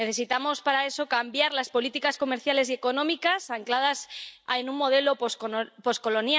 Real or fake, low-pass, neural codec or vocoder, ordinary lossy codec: real; none; none; none